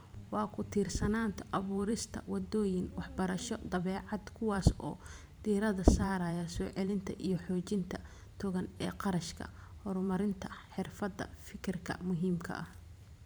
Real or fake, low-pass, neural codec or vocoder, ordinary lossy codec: fake; none; vocoder, 44.1 kHz, 128 mel bands every 512 samples, BigVGAN v2; none